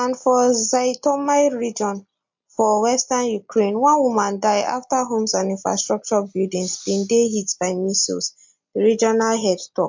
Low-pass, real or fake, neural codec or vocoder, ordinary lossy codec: 7.2 kHz; real; none; MP3, 48 kbps